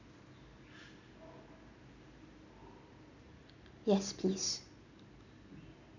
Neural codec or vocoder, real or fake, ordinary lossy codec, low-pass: none; real; none; 7.2 kHz